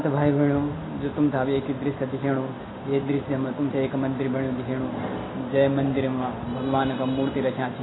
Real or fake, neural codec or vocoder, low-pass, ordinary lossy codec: real; none; 7.2 kHz; AAC, 16 kbps